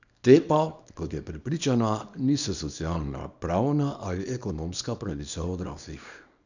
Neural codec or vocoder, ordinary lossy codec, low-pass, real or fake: codec, 24 kHz, 0.9 kbps, WavTokenizer, small release; none; 7.2 kHz; fake